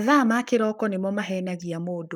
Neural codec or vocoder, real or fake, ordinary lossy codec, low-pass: codec, 44.1 kHz, 7.8 kbps, Pupu-Codec; fake; none; none